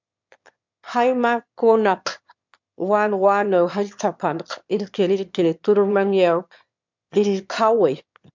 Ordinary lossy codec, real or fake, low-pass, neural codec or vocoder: MP3, 64 kbps; fake; 7.2 kHz; autoencoder, 22.05 kHz, a latent of 192 numbers a frame, VITS, trained on one speaker